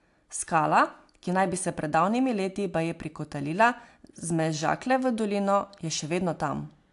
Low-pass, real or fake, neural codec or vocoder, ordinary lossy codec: 10.8 kHz; real; none; AAC, 64 kbps